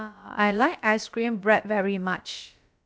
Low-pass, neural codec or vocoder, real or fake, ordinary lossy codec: none; codec, 16 kHz, about 1 kbps, DyCAST, with the encoder's durations; fake; none